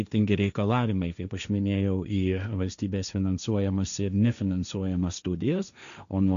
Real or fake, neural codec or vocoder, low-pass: fake; codec, 16 kHz, 1.1 kbps, Voila-Tokenizer; 7.2 kHz